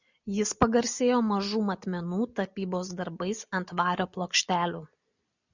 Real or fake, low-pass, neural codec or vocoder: real; 7.2 kHz; none